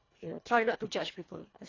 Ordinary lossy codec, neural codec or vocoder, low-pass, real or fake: AAC, 32 kbps; codec, 24 kHz, 1.5 kbps, HILCodec; 7.2 kHz; fake